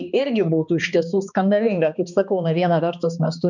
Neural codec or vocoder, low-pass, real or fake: codec, 16 kHz, 2 kbps, X-Codec, HuBERT features, trained on balanced general audio; 7.2 kHz; fake